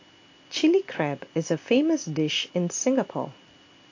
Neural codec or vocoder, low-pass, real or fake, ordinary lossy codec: none; 7.2 kHz; real; AAC, 48 kbps